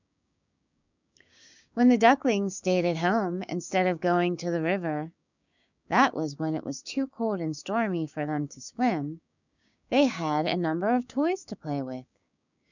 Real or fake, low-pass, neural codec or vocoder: fake; 7.2 kHz; codec, 16 kHz, 6 kbps, DAC